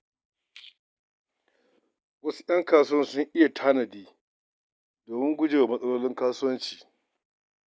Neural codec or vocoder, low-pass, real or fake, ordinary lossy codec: none; none; real; none